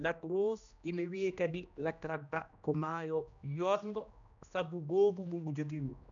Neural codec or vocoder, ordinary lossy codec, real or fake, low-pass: codec, 16 kHz, 1 kbps, X-Codec, HuBERT features, trained on general audio; none; fake; 7.2 kHz